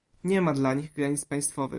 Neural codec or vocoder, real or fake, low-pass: none; real; 10.8 kHz